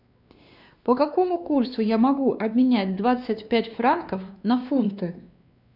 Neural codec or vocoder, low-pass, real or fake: codec, 16 kHz, 2 kbps, X-Codec, WavLM features, trained on Multilingual LibriSpeech; 5.4 kHz; fake